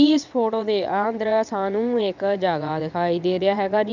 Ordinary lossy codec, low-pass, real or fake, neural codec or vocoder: none; 7.2 kHz; fake; vocoder, 22.05 kHz, 80 mel bands, WaveNeXt